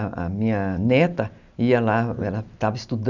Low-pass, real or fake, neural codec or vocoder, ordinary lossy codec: 7.2 kHz; real; none; none